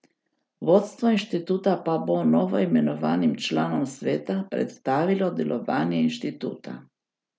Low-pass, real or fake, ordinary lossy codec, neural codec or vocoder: none; real; none; none